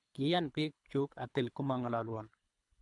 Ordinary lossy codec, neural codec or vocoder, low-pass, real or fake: none; codec, 24 kHz, 3 kbps, HILCodec; 10.8 kHz; fake